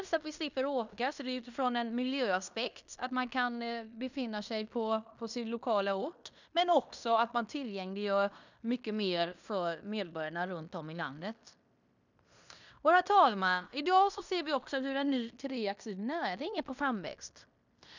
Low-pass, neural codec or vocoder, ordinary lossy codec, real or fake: 7.2 kHz; codec, 16 kHz in and 24 kHz out, 0.9 kbps, LongCat-Audio-Codec, fine tuned four codebook decoder; none; fake